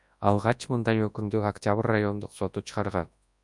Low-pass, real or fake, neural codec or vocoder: 10.8 kHz; fake; codec, 24 kHz, 0.9 kbps, WavTokenizer, large speech release